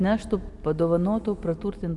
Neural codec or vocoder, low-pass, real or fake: none; 10.8 kHz; real